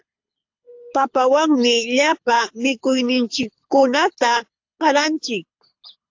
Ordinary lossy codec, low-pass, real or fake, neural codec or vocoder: AAC, 48 kbps; 7.2 kHz; fake; codec, 24 kHz, 6 kbps, HILCodec